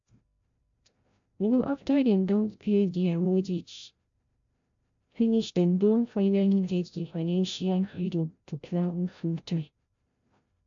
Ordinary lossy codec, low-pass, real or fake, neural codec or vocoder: AAC, 64 kbps; 7.2 kHz; fake; codec, 16 kHz, 0.5 kbps, FreqCodec, larger model